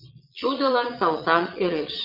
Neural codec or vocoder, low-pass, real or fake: vocoder, 22.05 kHz, 80 mel bands, Vocos; 5.4 kHz; fake